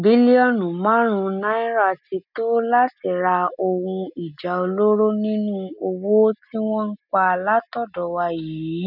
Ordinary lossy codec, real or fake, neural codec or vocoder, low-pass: none; real; none; 5.4 kHz